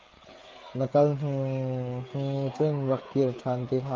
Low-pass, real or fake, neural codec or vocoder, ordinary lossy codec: 7.2 kHz; fake; codec, 16 kHz, 16 kbps, FreqCodec, smaller model; Opus, 24 kbps